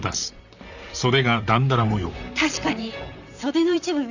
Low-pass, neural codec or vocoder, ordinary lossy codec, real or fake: 7.2 kHz; vocoder, 44.1 kHz, 128 mel bands, Pupu-Vocoder; none; fake